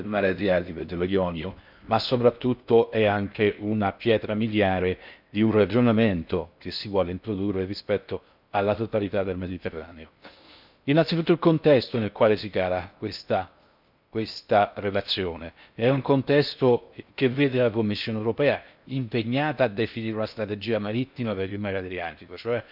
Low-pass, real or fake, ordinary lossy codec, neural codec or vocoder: 5.4 kHz; fake; none; codec, 16 kHz in and 24 kHz out, 0.6 kbps, FocalCodec, streaming, 4096 codes